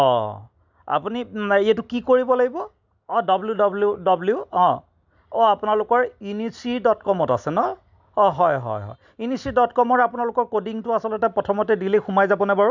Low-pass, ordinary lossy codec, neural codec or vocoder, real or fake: 7.2 kHz; none; none; real